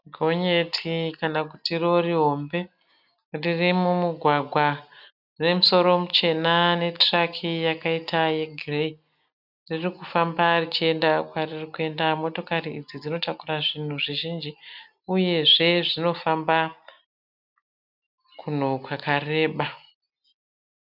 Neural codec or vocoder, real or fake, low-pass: none; real; 5.4 kHz